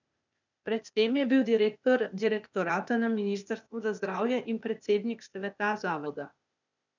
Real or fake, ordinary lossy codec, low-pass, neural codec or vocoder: fake; none; 7.2 kHz; codec, 16 kHz, 0.8 kbps, ZipCodec